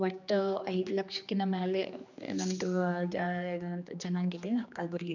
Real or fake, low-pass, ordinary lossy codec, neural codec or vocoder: fake; 7.2 kHz; none; codec, 16 kHz, 2 kbps, X-Codec, HuBERT features, trained on general audio